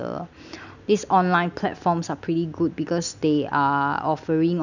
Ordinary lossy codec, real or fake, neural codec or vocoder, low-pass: none; real; none; 7.2 kHz